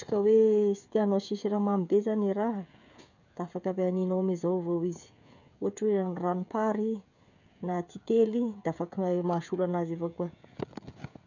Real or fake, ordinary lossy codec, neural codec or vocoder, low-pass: fake; none; codec, 16 kHz, 16 kbps, FreqCodec, smaller model; 7.2 kHz